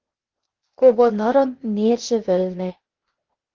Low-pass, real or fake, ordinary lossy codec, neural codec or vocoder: 7.2 kHz; fake; Opus, 16 kbps; codec, 16 kHz, 0.8 kbps, ZipCodec